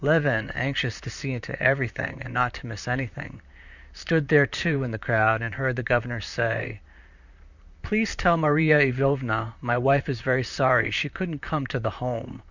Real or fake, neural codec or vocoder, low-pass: fake; vocoder, 44.1 kHz, 128 mel bands, Pupu-Vocoder; 7.2 kHz